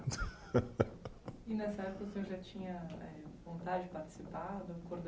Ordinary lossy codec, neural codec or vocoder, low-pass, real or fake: none; none; none; real